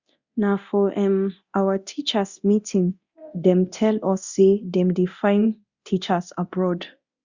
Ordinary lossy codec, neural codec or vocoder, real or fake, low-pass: Opus, 64 kbps; codec, 24 kHz, 0.9 kbps, DualCodec; fake; 7.2 kHz